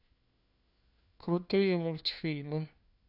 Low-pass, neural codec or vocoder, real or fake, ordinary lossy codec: 5.4 kHz; codec, 16 kHz, 1 kbps, FunCodec, trained on LibriTTS, 50 frames a second; fake; none